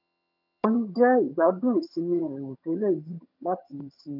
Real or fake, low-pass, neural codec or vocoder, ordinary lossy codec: fake; 5.4 kHz; vocoder, 22.05 kHz, 80 mel bands, HiFi-GAN; none